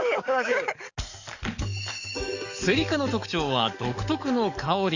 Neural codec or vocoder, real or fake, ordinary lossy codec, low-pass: codec, 44.1 kHz, 7.8 kbps, DAC; fake; none; 7.2 kHz